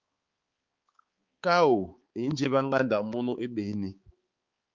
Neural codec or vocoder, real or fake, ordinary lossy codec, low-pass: codec, 16 kHz, 4 kbps, X-Codec, HuBERT features, trained on balanced general audio; fake; Opus, 32 kbps; 7.2 kHz